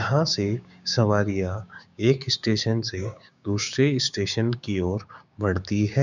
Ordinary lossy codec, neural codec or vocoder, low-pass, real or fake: none; codec, 16 kHz, 6 kbps, DAC; 7.2 kHz; fake